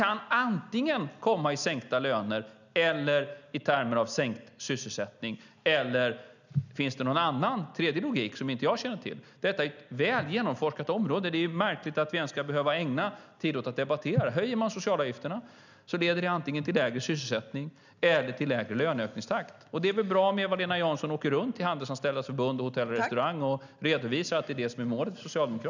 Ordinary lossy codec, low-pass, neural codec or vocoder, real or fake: none; 7.2 kHz; none; real